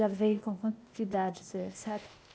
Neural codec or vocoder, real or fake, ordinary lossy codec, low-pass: codec, 16 kHz, 0.8 kbps, ZipCodec; fake; none; none